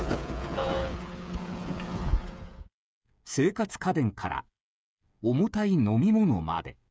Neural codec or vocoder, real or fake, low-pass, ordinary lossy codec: codec, 16 kHz, 8 kbps, FreqCodec, smaller model; fake; none; none